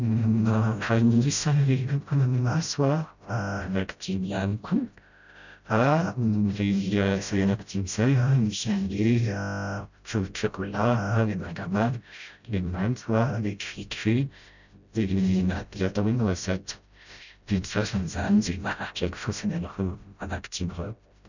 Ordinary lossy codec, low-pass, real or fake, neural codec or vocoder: none; 7.2 kHz; fake; codec, 16 kHz, 0.5 kbps, FreqCodec, smaller model